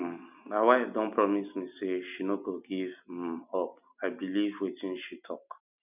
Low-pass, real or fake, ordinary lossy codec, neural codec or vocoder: 3.6 kHz; real; none; none